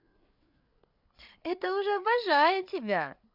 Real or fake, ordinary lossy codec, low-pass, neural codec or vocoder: fake; none; 5.4 kHz; codec, 16 kHz, 8 kbps, FreqCodec, larger model